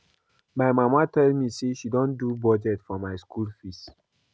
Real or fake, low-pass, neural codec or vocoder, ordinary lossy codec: real; none; none; none